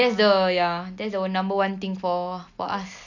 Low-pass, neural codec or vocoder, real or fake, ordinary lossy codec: 7.2 kHz; none; real; none